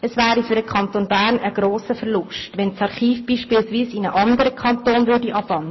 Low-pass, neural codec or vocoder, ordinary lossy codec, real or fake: 7.2 kHz; none; MP3, 24 kbps; real